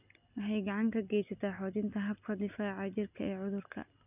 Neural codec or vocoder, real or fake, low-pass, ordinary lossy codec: none; real; 3.6 kHz; none